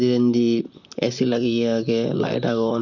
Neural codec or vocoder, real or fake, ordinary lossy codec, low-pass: vocoder, 44.1 kHz, 128 mel bands, Pupu-Vocoder; fake; none; 7.2 kHz